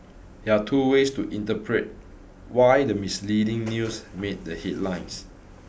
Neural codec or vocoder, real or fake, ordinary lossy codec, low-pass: none; real; none; none